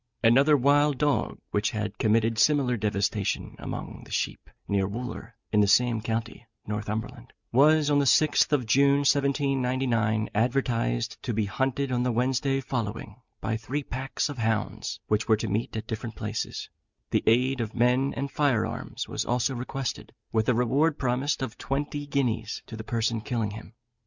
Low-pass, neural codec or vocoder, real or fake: 7.2 kHz; none; real